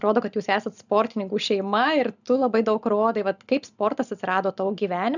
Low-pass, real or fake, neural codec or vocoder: 7.2 kHz; real; none